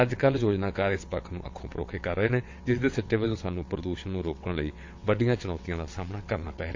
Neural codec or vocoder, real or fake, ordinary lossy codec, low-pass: vocoder, 22.05 kHz, 80 mel bands, WaveNeXt; fake; MP3, 48 kbps; 7.2 kHz